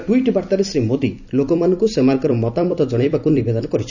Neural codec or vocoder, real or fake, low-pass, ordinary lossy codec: vocoder, 44.1 kHz, 128 mel bands every 256 samples, BigVGAN v2; fake; 7.2 kHz; none